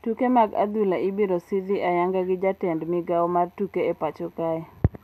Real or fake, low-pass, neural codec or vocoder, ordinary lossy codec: real; 14.4 kHz; none; none